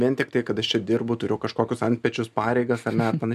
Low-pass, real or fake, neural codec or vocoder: 14.4 kHz; real; none